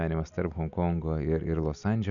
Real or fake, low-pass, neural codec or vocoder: real; 7.2 kHz; none